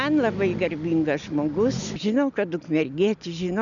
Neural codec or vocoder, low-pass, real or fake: none; 7.2 kHz; real